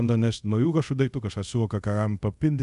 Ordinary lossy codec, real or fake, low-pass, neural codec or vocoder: MP3, 96 kbps; fake; 10.8 kHz; codec, 24 kHz, 0.5 kbps, DualCodec